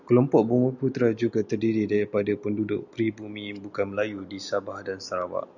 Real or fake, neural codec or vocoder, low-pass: real; none; 7.2 kHz